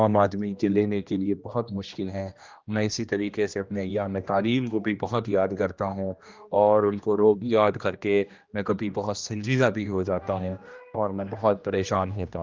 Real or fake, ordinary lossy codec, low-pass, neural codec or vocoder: fake; Opus, 24 kbps; 7.2 kHz; codec, 16 kHz, 1 kbps, X-Codec, HuBERT features, trained on general audio